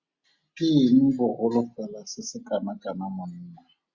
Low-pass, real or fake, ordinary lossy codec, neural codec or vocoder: 7.2 kHz; real; Opus, 64 kbps; none